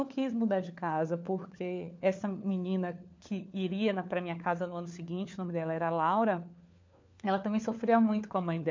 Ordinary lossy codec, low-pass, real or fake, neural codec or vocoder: MP3, 64 kbps; 7.2 kHz; fake; codec, 16 kHz, 4 kbps, FunCodec, trained on LibriTTS, 50 frames a second